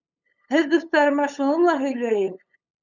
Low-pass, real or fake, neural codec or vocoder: 7.2 kHz; fake; codec, 16 kHz, 8 kbps, FunCodec, trained on LibriTTS, 25 frames a second